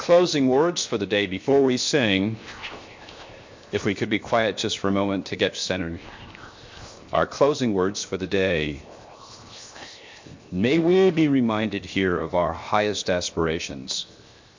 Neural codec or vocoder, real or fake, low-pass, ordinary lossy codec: codec, 16 kHz, 0.7 kbps, FocalCodec; fake; 7.2 kHz; MP3, 48 kbps